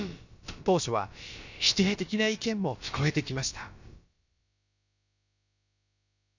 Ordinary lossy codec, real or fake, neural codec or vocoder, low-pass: none; fake; codec, 16 kHz, about 1 kbps, DyCAST, with the encoder's durations; 7.2 kHz